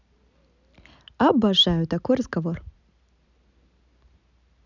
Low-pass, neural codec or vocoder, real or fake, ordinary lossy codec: 7.2 kHz; none; real; none